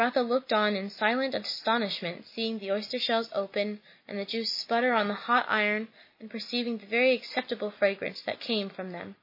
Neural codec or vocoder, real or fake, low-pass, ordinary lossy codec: none; real; 5.4 kHz; MP3, 24 kbps